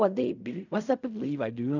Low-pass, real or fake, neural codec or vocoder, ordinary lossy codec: 7.2 kHz; fake; codec, 16 kHz in and 24 kHz out, 0.4 kbps, LongCat-Audio-Codec, fine tuned four codebook decoder; none